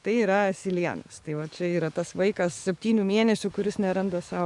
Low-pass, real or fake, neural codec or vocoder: 10.8 kHz; fake; codec, 44.1 kHz, 7.8 kbps, DAC